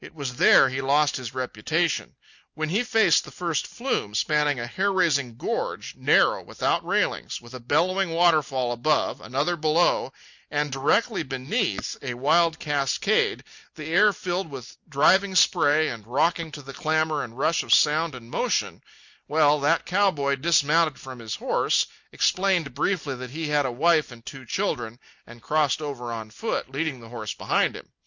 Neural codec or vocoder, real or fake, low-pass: none; real; 7.2 kHz